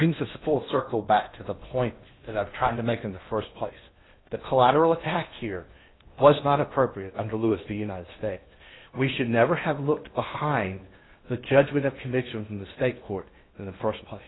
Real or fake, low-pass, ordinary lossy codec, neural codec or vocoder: fake; 7.2 kHz; AAC, 16 kbps; codec, 16 kHz in and 24 kHz out, 0.8 kbps, FocalCodec, streaming, 65536 codes